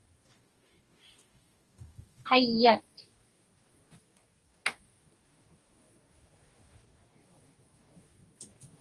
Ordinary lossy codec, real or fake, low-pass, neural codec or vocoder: Opus, 24 kbps; fake; 10.8 kHz; codec, 44.1 kHz, 2.6 kbps, DAC